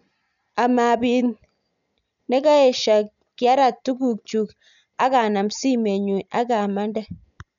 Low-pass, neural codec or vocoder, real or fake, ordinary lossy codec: 7.2 kHz; none; real; none